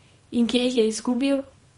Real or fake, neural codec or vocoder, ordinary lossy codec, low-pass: fake; codec, 24 kHz, 0.9 kbps, WavTokenizer, small release; MP3, 48 kbps; 10.8 kHz